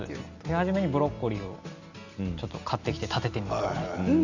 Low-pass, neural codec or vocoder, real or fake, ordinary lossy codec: 7.2 kHz; none; real; Opus, 64 kbps